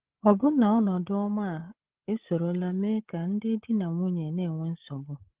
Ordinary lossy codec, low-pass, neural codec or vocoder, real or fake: Opus, 16 kbps; 3.6 kHz; codec, 16 kHz, 8 kbps, FreqCodec, larger model; fake